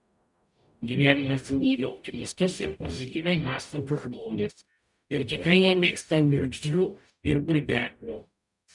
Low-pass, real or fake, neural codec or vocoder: 10.8 kHz; fake; codec, 44.1 kHz, 0.9 kbps, DAC